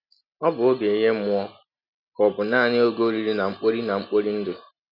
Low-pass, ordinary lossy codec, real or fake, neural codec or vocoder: 5.4 kHz; none; real; none